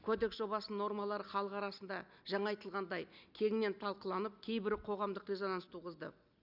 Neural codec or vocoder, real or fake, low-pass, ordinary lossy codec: none; real; 5.4 kHz; none